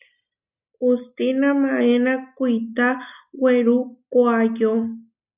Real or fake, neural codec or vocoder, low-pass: real; none; 3.6 kHz